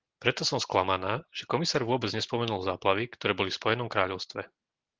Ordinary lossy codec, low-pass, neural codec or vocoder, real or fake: Opus, 16 kbps; 7.2 kHz; none; real